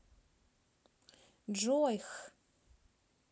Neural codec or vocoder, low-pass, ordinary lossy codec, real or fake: none; none; none; real